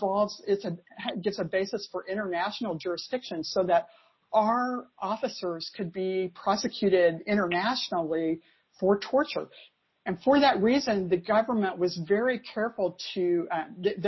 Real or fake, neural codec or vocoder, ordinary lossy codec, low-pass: real; none; MP3, 24 kbps; 7.2 kHz